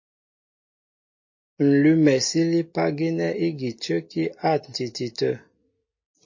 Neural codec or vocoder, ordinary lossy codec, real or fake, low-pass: none; MP3, 32 kbps; real; 7.2 kHz